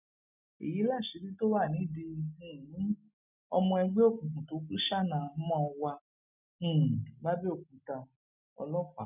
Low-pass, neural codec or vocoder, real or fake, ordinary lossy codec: 3.6 kHz; none; real; none